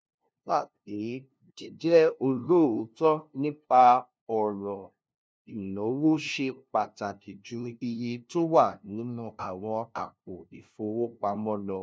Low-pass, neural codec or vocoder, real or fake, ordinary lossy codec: none; codec, 16 kHz, 0.5 kbps, FunCodec, trained on LibriTTS, 25 frames a second; fake; none